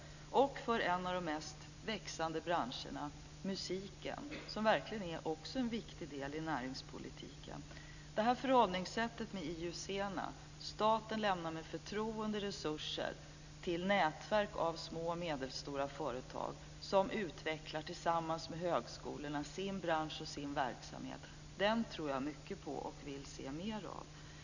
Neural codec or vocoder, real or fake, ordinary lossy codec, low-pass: none; real; none; 7.2 kHz